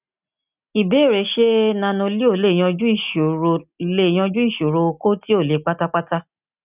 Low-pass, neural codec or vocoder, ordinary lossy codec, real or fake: 3.6 kHz; none; none; real